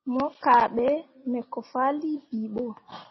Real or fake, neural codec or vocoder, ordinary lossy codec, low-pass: real; none; MP3, 24 kbps; 7.2 kHz